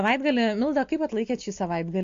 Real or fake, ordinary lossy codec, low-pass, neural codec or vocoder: real; AAC, 48 kbps; 7.2 kHz; none